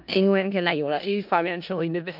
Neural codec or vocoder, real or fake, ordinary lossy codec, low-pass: codec, 16 kHz in and 24 kHz out, 0.4 kbps, LongCat-Audio-Codec, four codebook decoder; fake; MP3, 48 kbps; 5.4 kHz